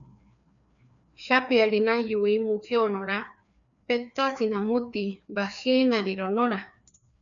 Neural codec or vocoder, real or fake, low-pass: codec, 16 kHz, 2 kbps, FreqCodec, larger model; fake; 7.2 kHz